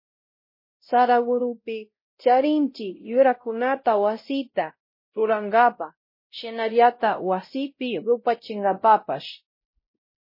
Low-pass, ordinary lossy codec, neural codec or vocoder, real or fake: 5.4 kHz; MP3, 24 kbps; codec, 16 kHz, 0.5 kbps, X-Codec, WavLM features, trained on Multilingual LibriSpeech; fake